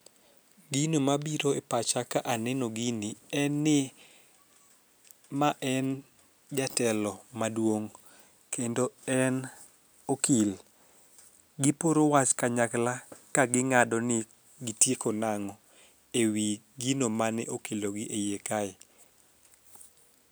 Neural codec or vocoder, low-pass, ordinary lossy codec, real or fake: none; none; none; real